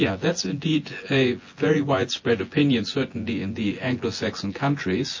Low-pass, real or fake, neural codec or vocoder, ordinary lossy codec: 7.2 kHz; fake; vocoder, 24 kHz, 100 mel bands, Vocos; MP3, 32 kbps